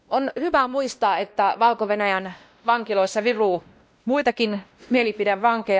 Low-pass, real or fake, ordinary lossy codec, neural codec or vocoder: none; fake; none; codec, 16 kHz, 1 kbps, X-Codec, WavLM features, trained on Multilingual LibriSpeech